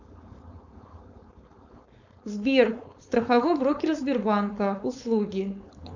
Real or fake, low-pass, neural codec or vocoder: fake; 7.2 kHz; codec, 16 kHz, 4.8 kbps, FACodec